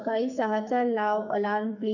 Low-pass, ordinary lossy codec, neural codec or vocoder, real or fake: 7.2 kHz; none; codec, 44.1 kHz, 2.6 kbps, SNAC; fake